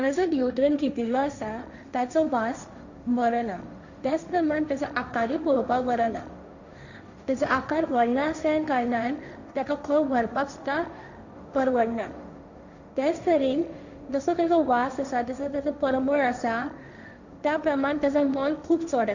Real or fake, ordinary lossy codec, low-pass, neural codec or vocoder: fake; none; 7.2 kHz; codec, 16 kHz, 1.1 kbps, Voila-Tokenizer